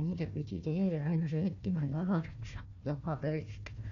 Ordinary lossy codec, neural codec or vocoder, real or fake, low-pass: MP3, 96 kbps; codec, 16 kHz, 1 kbps, FunCodec, trained on Chinese and English, 50 frames a second; fake; 7.2 kHz